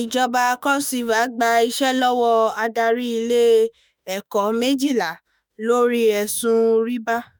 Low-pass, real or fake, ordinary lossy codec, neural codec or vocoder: none; fake; none; autoencoder, 48 kHz, 32 numbers a frame, DAC-VAE, trained on Japanese speech